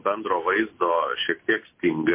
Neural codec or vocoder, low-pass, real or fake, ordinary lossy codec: none; 3.6 kHz; real; MP3, 24 kbps